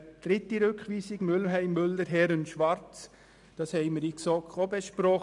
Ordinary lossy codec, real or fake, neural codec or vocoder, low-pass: none; real; none; 10.8 kHz